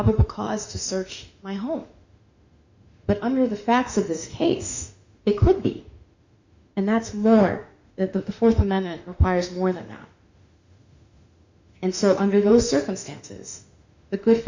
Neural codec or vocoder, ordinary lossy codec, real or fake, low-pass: autoencoder, 48 kHz, 32 numbers a frame, DAC-VAE, trained on Japanese speech; Opus, 64 kbps; fake; 7.2 kHz